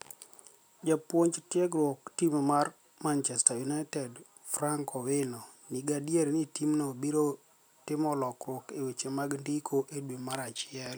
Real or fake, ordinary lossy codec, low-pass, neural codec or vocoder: real; none; none; none